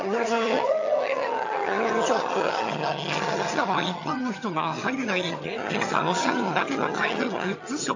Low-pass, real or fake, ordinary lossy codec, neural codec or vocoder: 7.2 kHz; fake; AAC, 48 kbps; vocoder, 22.05 kHz, 80 mel bands, HiFi-GAN